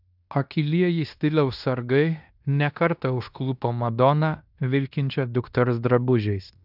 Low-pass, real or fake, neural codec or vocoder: 5.4 kHz; fake; codec, 16 kHz in and 24 kHz out, 0.9 kbps, LongCat-Audio-Codec, fine tuned four codebook decoder